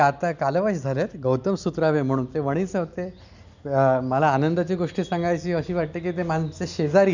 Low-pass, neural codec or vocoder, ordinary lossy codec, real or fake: 7.2 kHz; none; none; real